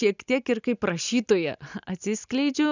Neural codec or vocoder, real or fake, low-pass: none; real; 7.2 kHz